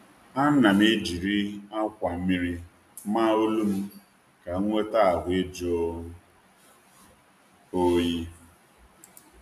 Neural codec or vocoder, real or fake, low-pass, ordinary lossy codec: none; real; 14.4 kHz; none